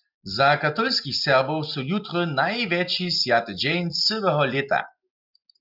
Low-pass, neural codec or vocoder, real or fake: 5.4 kHz; none; real